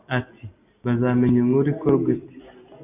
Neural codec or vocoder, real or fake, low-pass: none; real; 3.6 kHz